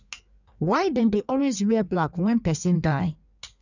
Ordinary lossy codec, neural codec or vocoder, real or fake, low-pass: none; codec, 16 kHz in and 24 kHz out, 1.1 kbps, FireRedTTS-2 codec; fake; 7.2 kHz